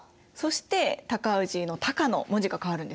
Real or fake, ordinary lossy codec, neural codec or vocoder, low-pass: real; none; none; none